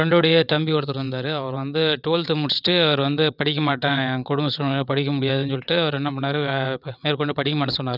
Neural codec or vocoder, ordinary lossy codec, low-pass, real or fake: vocoder, 22.05 kHz, 80 mel bands, WaveNeXt; none; 5.4 kHz; fake